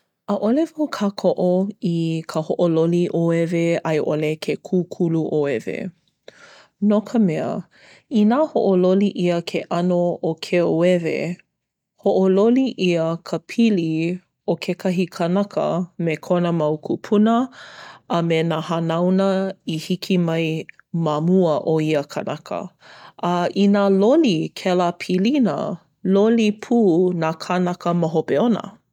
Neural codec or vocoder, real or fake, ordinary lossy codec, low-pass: none; real; none; 19.8 kHz